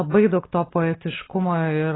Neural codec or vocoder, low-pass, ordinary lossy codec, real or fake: none; 7.2 kHz; AAC, 16 kbps; real